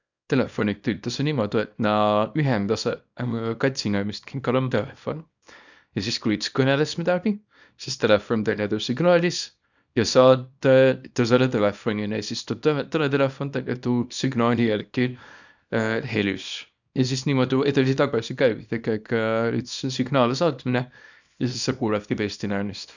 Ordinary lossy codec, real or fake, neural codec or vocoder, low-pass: none; fake; codec, 24 kHz, 0.9 kbps, WavTokenizer, small release; 7.2 kHz